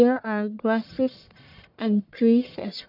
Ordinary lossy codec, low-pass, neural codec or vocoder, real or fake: none; 5.4 kHz; codec, 44.1 kHz, 1.7 kbps, Pupu-Codec; fake